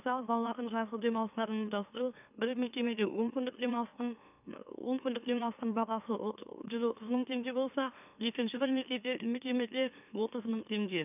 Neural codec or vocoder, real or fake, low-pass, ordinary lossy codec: autoencoder, 44.1 kHz, a latent of 192 numbers a frame, MeloTTS; fake; 3.6 kHz; none